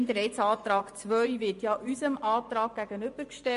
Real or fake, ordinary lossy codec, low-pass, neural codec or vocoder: fake; MP3, 48 kbps; 10.8 kHz; vocoder, 24 kHz, 100 mel bands, Vocos